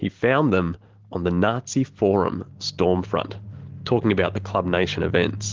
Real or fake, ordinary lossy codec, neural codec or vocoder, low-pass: real; Opus, 16 kbps; none; 7.2 kHz